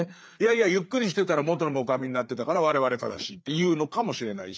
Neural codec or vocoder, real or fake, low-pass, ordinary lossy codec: codec, 16 kHz, 4 kbps, FreqCodec, larger model; fake; none; none